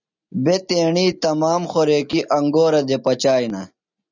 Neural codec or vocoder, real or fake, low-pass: none; real; 7.2 kHz